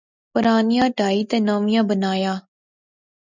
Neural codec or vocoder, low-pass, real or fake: none; 7.2 kHz; real